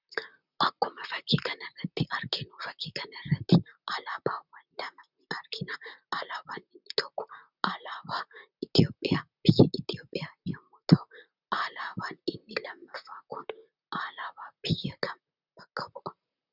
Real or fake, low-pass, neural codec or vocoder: fake; 5.4 kHz; vocoder, 44.1 kHz, 128 mel bands, Pupu-Vocoder